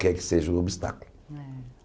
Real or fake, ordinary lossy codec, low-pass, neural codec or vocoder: real; none; none; none